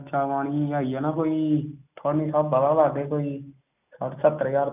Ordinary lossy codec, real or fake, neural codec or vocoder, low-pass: none; real; none; 3.6 kHz